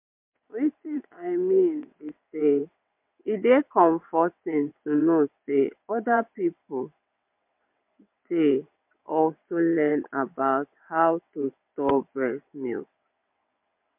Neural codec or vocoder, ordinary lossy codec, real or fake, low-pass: vocoder, 22.05 kHz, 80 mel bands, WaveNeXt; MP3, 32 kbps; fake; 3.6 kHz